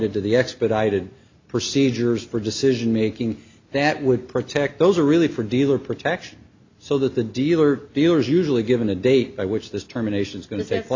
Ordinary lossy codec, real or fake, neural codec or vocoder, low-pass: MP3, 64 kbps; real; none; 7.2 kHz